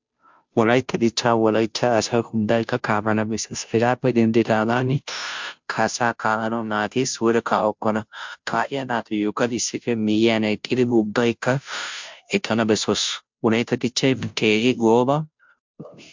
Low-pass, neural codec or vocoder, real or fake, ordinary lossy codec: 7.2 kHz; codec, 16 kHz, 0.5 kbps, FunCodec, trained on Chinese and English, 25 frames a second; fake; MP3, 64 kbps